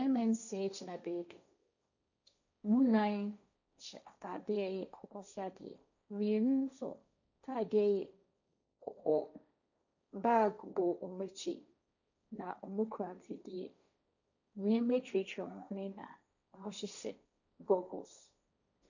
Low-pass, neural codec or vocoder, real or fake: 7.2 kHz; codec, 16 kHz, 1.1 kbps, Voila-Tokenizer; fake